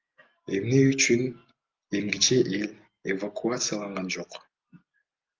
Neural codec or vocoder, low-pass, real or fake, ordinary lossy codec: none; 7.2 kHz; real; Opus, 32 kbps